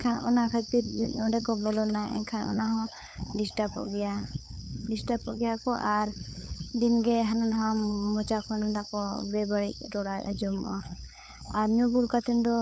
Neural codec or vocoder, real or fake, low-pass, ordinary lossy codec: codec, 16 kHz, 8 kbps, FunCodec, trained on LibriTTS, 25 frames a second; fake; none; none